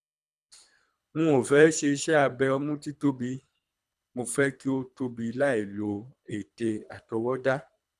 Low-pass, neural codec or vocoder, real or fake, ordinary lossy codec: none; codec, 24 kHz, 3 kbps, HILCodec; fake; none